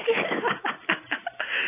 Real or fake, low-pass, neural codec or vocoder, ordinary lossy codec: real; 3.6 kHz; none; AAC, 16 kbps